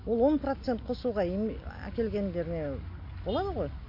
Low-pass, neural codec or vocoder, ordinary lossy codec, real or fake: 5.4 kHz; none; MP3, 32 kbps; real